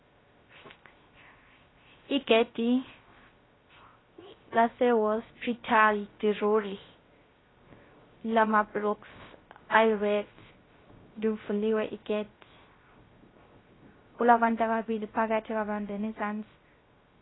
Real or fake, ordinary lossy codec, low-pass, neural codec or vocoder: fake; AAC, 16 kbps; 7.2 kHz; codec, 16 kHz, 0.3 kbps, FocalCodec